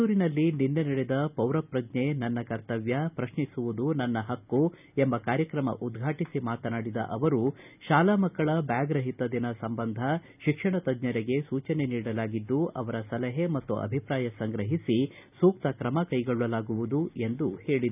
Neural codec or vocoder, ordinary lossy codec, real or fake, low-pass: none; none; real; 3.6 kHz